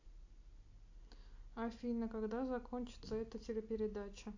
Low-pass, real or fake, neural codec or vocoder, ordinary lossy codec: 7.2 kHz; real; none; AAC, 48 kbps